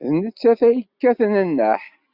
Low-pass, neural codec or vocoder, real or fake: 5.4 kHz; none; real